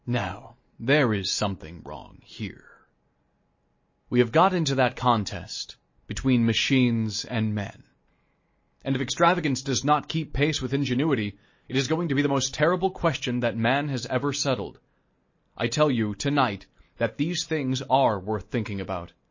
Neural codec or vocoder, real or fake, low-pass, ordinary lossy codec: none; real; 7.2 kHz; MP3, 32 kbps